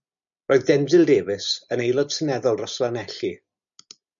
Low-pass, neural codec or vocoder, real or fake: 7.2 kHz; none; real